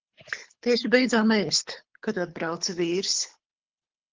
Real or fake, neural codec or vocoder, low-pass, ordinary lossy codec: fake; codec, 24 kHz, 6 kbps, HILCodec; 7.2 kHz; Opus, 16 kbps